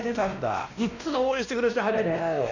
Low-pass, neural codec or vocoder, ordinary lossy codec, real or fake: 7.2 kHz; codec, 16 kHz, 1 kbps, X-Codec, WavLM features, trained on Multilingual LibriSpeech; none; fake